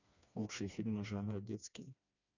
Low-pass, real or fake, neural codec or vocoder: 7.2 kHz; fake; codec, 16 kHz, 2 kbps, FreqCodec, smaller model